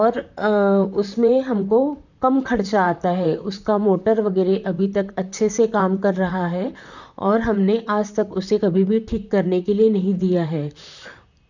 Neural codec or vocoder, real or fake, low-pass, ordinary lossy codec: vocoder, 44.1 kHz, 128 mel bands, Pupu-Vocoder; fake; 7.2 kHz; none